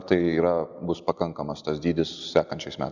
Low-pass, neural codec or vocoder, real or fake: 7.2 kHz; none; real